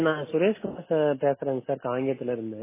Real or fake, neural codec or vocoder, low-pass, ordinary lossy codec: real; none; 3.6 kHz; MP3, 16 kbps